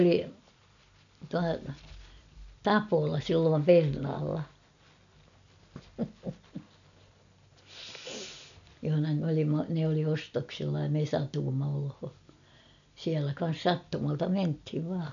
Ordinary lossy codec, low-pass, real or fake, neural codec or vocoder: none; 7.2 kHz; real; none